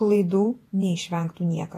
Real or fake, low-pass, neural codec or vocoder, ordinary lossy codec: fake; 14.4 kHz; vocoder, 48 kHz, 128 mel bands, Vocos; AAC, 48 kbps